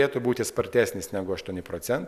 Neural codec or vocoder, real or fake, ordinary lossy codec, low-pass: none; real; AAC, 96 kbps; 14.4 kHz